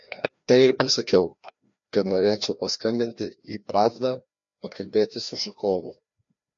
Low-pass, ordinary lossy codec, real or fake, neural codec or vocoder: 7.2 kHz; MP3, 48 kbps; fake; codec, 16 kHz, 1 kbps, FreqCodec, larger model